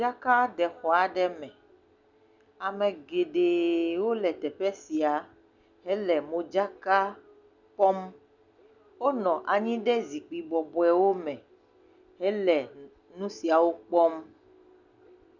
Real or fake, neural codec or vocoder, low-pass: real; none; 7.2 kHz